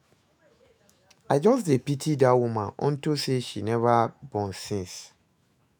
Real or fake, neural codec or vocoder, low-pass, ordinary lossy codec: fake; autoencoder, 48 kHz, 128 numbers a frame, DAC-VAE, trained on Japanese speech; none; none